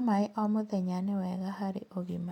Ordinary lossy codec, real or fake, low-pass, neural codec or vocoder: none; real; 19.8 kHz; none